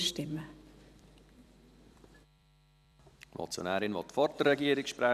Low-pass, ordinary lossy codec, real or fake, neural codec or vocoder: 14.4 kHz; none; fake; vocoder, 44.1 kHz, 128 mel bands every 256 samples, BigVGAN v2